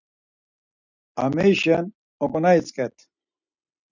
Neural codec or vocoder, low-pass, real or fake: none; 7.2 kHz; real